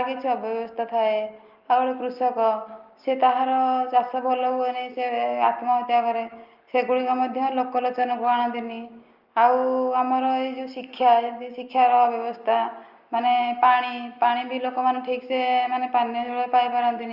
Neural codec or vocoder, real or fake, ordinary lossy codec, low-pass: none; real; Opus, 32 kbps; 5.4 kHz